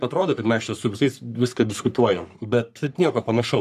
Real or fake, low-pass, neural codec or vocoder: fake; 14.4 kHz; codec, 44.1 kHz, 3.4 kbps, Pupu-Codec